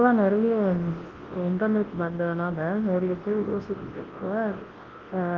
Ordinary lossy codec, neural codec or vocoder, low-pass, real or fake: Opus, 16 kbps; codec, 24 kHz, 0.9 kbps, WavTokenizer, large speech release; 7.2 kHz; fake